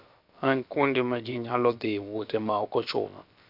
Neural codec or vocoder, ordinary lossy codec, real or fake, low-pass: codec, 16 kHz, about 1 kbps, DyCAST, with the encoder's durations; none; fake; 5.4 kHz